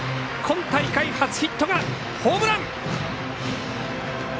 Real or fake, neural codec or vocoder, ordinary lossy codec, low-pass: real; none; none; none